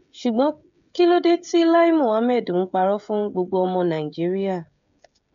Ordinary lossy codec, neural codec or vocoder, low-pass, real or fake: none; codec, 16 kHz, 16 kbps, FreqCodec, smaller model; 7.2 kHz; fake